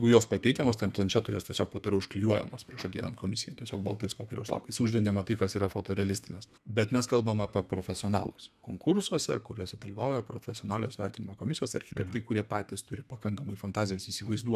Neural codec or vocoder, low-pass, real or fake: codec, 32 kHz, 1.9 kbps, SNAC; 14.4 kHz; fake